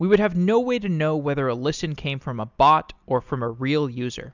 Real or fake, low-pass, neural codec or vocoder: real; 7.2 kHz; none